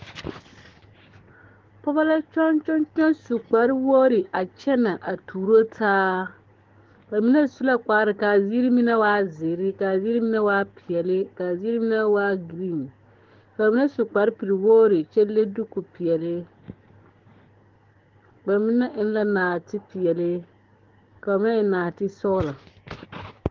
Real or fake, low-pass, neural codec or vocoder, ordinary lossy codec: fake; 7.2 kHz; codec, 16 kHz, 6 kbps, DAC; Opus, 16 kbps